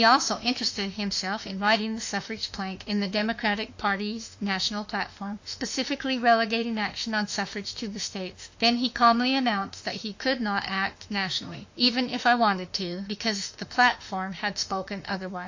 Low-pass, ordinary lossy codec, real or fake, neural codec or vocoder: 7.2 kHz; AAC, 48 kbps; fake; autoencoder, 48 kHz, 32 numbers a frame, DAC-VAE, trained on Japanese speech